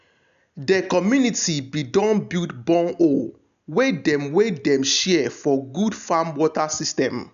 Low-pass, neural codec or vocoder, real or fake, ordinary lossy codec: 7.2 kHz; none; real; none